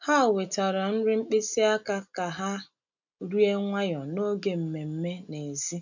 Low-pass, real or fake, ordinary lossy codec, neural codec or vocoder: 7.2 kHz; real; none; none